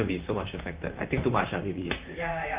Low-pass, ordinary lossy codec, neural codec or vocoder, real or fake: 3.6 kHz; Opus, 24 kbps; vocoder, 44.1 kHz, 128 mel bands, Pupu-Vocoder; fake